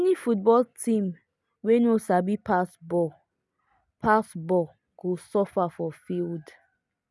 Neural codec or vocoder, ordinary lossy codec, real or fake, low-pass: none; none; real; none